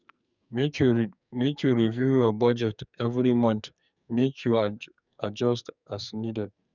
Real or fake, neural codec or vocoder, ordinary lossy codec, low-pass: fake; codec, 44.1 kHz, 2.6 kbps, SNAC; none; 7.2 kHz